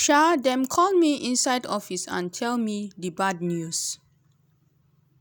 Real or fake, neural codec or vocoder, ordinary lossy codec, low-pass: real; none; none; none